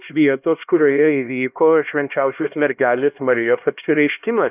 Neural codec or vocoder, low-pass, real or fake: codec, 16 kHz, 1 kbps, X-Codec, HuBERT features, trained on LibriSpeech; 3.6 kHz; fake